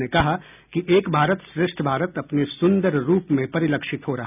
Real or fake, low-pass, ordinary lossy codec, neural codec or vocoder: real; 3.6 kHz; none; none